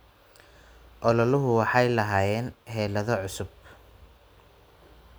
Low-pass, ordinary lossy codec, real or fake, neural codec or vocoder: none; none; real; none